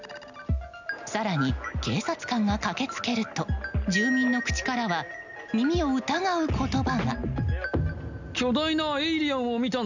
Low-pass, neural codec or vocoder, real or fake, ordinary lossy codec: 7.2 kHz; none; real; none